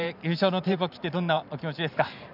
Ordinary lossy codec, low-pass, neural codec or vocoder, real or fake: none; 5.4 kHz; vocoder, 44.1 kHz, 128 mel bands every 512 samples, BigVGAN v2; fake